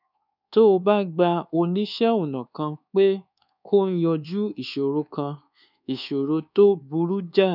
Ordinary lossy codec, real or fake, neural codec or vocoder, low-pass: none; fake; codec, 24 kHz, 1.2 kbps, DualCodec; 5.4 kHz